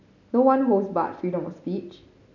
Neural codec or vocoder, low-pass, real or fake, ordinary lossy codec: none; 7.2 kHz; real; none